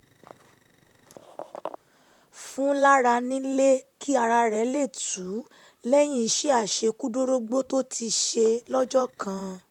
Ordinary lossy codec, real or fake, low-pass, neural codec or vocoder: none; fake; 19.8 kHz; vocoder, 44.1 kHz, 128 mel bands, Pupu-Vocoder